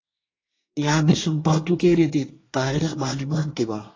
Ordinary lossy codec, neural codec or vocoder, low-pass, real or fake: AAC, 32 kbps; codec, 24 kHz, 1 kbps, SNAC; 7.2 kHz; fake